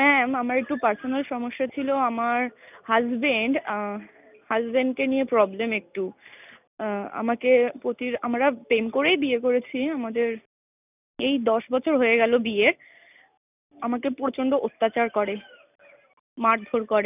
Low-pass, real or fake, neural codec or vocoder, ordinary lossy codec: 3.6 kHz; real; none; none